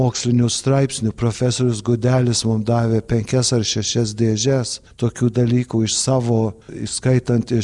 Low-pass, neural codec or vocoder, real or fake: 10.8 kHz; none; real